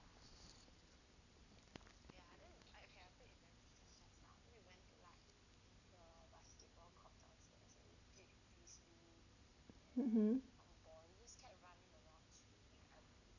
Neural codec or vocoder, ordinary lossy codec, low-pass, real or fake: none; none; 7.2 kHz; real